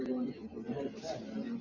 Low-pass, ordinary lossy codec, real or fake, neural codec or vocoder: 7.2 kHz; MP3, 48 kbps; real; none